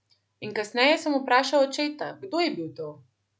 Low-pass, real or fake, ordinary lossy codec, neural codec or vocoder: none; real; none; none